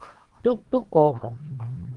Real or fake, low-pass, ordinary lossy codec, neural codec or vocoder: fake; 10.8 kHz; Opus, 24 kbps; codec, 24 kHz, 0.9 kbps, WavTokenizer, small release